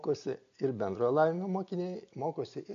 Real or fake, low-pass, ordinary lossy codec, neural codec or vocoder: real; 7.2 kHz; AAC, 64 kbps; none